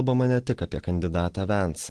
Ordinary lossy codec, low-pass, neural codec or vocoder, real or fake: Opus, 16 kbps; 10.8 kHz; none; real